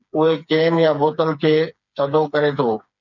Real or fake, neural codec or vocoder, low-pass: fake; codec, 16 kHz, 4 kbps, FreqCodec, smaller model; 7.2 kHz